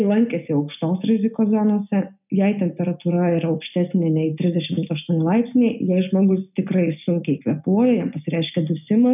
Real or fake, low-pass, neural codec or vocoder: real; 3.6 kHz; none